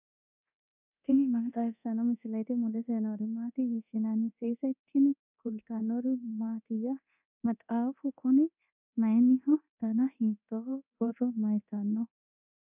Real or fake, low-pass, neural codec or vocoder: fake; 3.6 kHz; codec, 24 kHz, 0.9 kbps, DualCodec